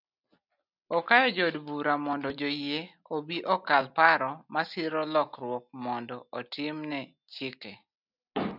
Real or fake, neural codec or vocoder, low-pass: real; none; 5.4 kHz